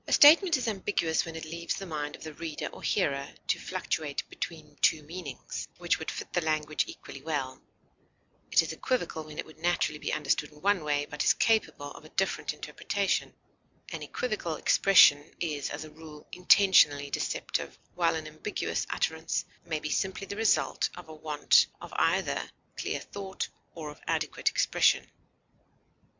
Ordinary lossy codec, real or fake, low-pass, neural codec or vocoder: MP3, 64 kbps; real; 7.2 kHz; none